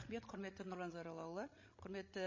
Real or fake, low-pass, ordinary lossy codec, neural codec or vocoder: fake; 7.2 kHz; MP3, 32 kbps; codec, 16 kHz, 16 kbps, FreqCodec, larger model